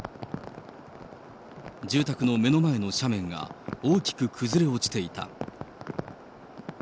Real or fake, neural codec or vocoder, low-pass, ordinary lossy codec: real; none; none; none